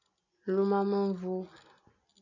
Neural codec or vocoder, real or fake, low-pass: none; real; 7.2 kHz